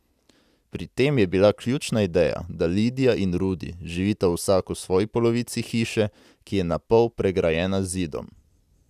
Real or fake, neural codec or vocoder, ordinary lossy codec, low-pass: real; none; none; 14.4 kHz